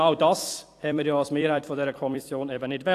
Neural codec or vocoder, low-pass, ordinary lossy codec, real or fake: vocoder, 44.1 kHz, 128 mel bands every 256 samples, BigVGAN v2; 14.4 kHz; AAC, 64 kbps; fake